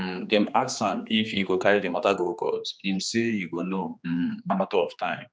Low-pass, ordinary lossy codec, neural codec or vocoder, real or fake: none; none; codec, 16 kHz, 2 kbps, X-Codec, HuBERT features, trained on general audio; fake